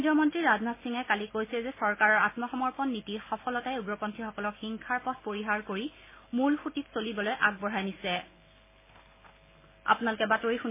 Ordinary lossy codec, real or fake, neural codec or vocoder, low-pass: MP3, 16 kbps; real; none; 3.6 kHz